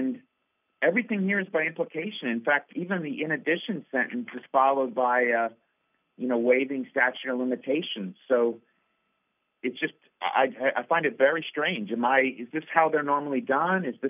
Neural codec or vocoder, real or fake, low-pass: none; real; 3.6 kHz